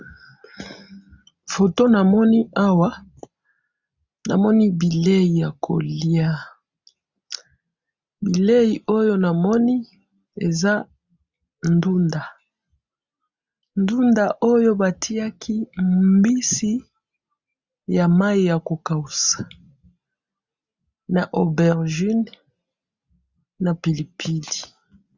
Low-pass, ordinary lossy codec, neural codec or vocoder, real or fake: 7.2 kHz; Opus, 64 kbps; none; real